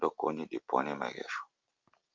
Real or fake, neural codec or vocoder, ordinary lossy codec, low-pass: real; none; Opus, 32 kbps; 7.2 kHz